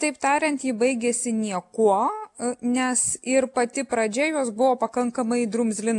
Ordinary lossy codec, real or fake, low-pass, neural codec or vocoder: AAC, 64 kbps; real; 10.8 kHz; none